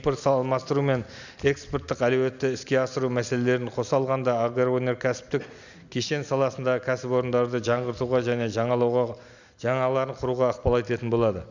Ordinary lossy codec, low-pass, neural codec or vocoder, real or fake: none; 7.2 kHz; none; real